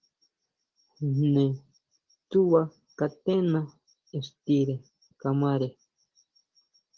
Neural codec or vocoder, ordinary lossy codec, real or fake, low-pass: none; Opus, 16 kbps; real; 7.2 kHz